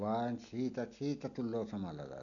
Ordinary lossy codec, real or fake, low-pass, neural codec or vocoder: none; real; 7.2 kHz; none